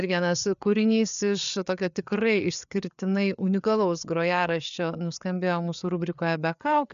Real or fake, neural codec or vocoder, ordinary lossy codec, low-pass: fake; codec, 16 kHz, 4 kbps, FreqCodec, larger model; MP3, 96 kbps; 7.2 kHz